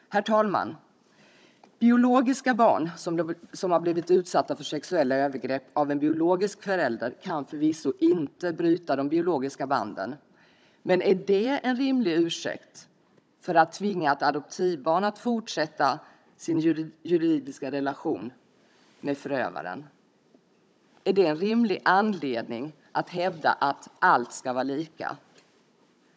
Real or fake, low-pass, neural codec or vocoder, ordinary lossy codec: fake; none; codec, 16 kHz, 16 kbps, FunCodec, trained on Chinese and English, 50 frames a second; none